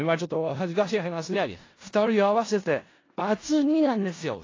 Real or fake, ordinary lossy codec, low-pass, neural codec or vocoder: fake; AAC, 32 kbps; 7.2 kHz; codec, 16 kHz in and 24 kHz out, 0.4 kbps, LongCat-Audio-Codec, four codebook decoder